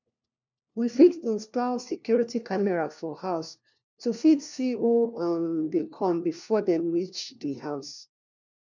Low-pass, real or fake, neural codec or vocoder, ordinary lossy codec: 7.2 kHz; fake; codec, 16 kHz, 1 kbps, FunCodec, trained on LibriTTS, 50 frames a second; none